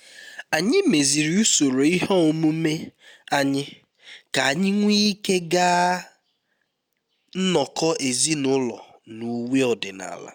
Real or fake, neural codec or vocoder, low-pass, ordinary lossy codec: real; none; none; none